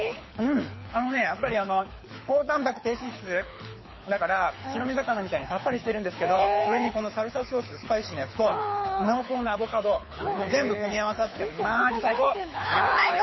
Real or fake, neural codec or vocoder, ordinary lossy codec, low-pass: fake; codec, 24 kHz, 6 kbps, HILCodec; MP3, 24 kbps; 7.2 kHz